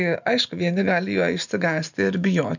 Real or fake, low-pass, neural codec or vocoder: real; 7.2 kHz; none